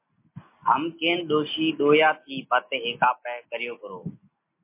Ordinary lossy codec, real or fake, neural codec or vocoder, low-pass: MP3, 24 kbps; real; none; 3.6 kHz